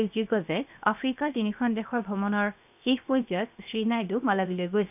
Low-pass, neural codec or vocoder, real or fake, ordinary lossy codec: 3.6 kHz; codec, 16 kHz, 0.7 kbps, FocalCodec; fake; none